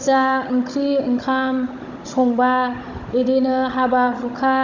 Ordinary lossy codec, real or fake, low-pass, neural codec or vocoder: none; fake; 7.2 kHz; codec, 16 kHz, 16 kbps, FunCodec, trained on Chinese and English, 50 frames a second